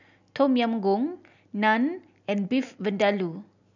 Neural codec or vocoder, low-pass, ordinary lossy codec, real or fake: none; 7.2 kHz; none; real